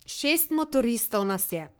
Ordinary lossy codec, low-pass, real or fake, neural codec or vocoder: none; none; fake; codec, 44.1 kHz, 3.4 kbps, Pupu-Codec